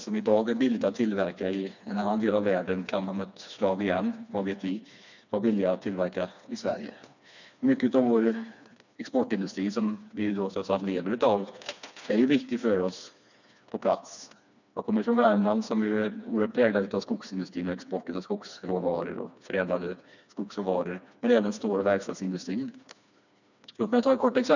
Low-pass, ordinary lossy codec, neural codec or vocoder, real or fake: 7.2 kHz; none; codec, 16 kHz, 2 kbps, FreqCodec, smaller model; fake